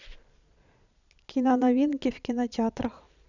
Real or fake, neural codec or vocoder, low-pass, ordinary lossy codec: fake; vocoder, 22.05 kHz, 80 mel bands, Vocos; 7.2 kHz; none